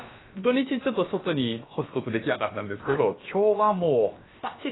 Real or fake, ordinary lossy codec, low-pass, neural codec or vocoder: fake; AAC, 16 kbps; 7.2 kHz; codec, 16 kHz, about 1 kbps, DyCAST, with the encoder's durations